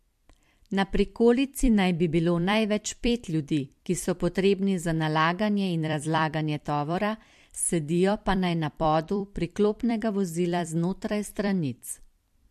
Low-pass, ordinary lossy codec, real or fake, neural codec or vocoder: 14.4 kHz; MP3, 64 kbps; fake; vocoder, 44.1 kHz, 128 mel bands every 256 samples, BigVGAN v2